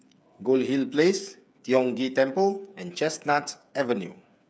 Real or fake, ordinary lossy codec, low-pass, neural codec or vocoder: fake; none; none; codec, 16 kHz, 8 kbps, FreqCodec, smaller model